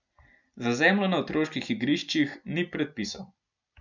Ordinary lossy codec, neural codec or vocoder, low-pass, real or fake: none; none; 7.2 kHz; real